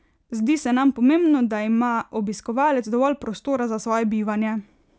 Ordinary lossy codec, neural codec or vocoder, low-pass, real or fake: none; none; none; real